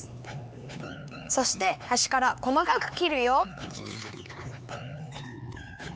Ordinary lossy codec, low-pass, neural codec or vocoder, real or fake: none; none; codec, 16 kHz, 4 kbps, X-Codec, HuBERT features, trained on LibriSpeech; fake